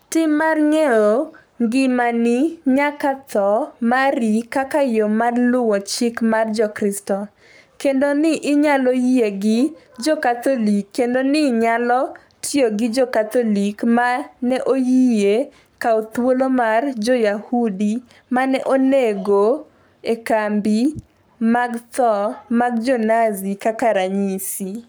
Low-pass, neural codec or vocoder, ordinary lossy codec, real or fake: none; codec, 44.1 kHz, 7.8 kbps, Pupu-Codec; none; fake